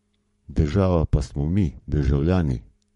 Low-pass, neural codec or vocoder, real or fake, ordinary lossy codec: 19.8 kHz; codec, 44.1 kHz, 7.8 kbps, Pupu-Codec; fake; MP3, 48 kbps